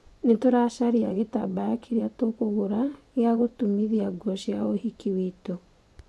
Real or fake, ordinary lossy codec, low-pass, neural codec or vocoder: fake; none; none; vocoder, 24 kHz, 100 mel bands, Vocos